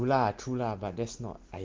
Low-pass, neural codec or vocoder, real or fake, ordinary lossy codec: 7.2 kHz; none; real; Opus, 16 kbps